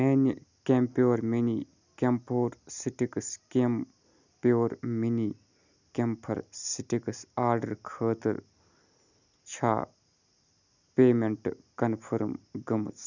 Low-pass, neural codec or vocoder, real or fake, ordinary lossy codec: 7.2 kHz; none; real; none